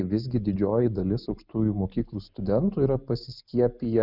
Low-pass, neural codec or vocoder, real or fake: 5.4 kHz; none; real